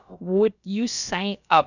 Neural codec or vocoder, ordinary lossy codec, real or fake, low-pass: codec, 16 kHz, about 1 kbps, DyCAST, with the encoder's durations; none; fake; 7.2 kHz